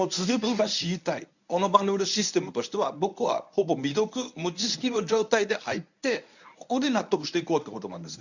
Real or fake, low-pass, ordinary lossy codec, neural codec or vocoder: fake; 7.2 kHz; none; codec, 24 kHz, 0.9 kbps, WavTokenizer, medium speech release version 1